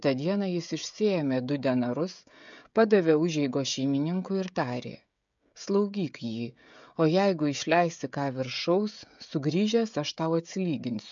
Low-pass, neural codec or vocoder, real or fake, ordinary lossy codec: 7.2 kHz; codec, 16 kHz, 16 kbps, FreqCodec, smaller model; fake; MP3, 64 kbps